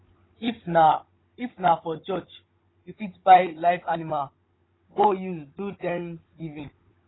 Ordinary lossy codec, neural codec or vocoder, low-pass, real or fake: AAC, 16 kbps; codec, 16 kHz, 16 kbps, FunCodec, trained on Chinese and English, 50 frames a second; 7.2 kHz; fake